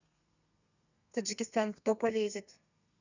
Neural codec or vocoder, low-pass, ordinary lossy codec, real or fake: codec, 32 kHz, 1.9 kbps, SNAC; 7.2 kHz; none; fake